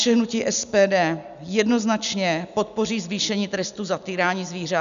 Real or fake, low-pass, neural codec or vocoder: real; 7.2 kHz; none